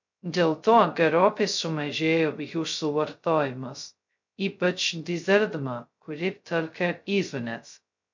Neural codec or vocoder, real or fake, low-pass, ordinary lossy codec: codec, 16 kHz, 0.2 kbps, FocalCodec; fake; 7.2 kHz; MP3, 64 kbps